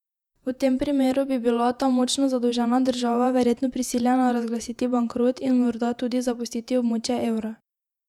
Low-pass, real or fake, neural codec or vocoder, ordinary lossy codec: 19.8 kHz; fake; vocoder, 48 kHz, 128 mel bands, Vocos; none